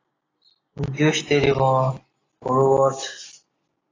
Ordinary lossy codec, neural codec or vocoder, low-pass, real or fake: AAC, 32 kbps; none; 7.2 kHz; real